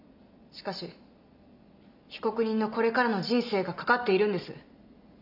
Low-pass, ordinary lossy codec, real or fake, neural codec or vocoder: 5.4 kHz; none; real; none